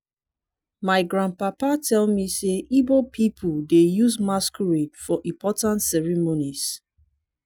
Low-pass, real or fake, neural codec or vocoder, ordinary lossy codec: none; real; none; none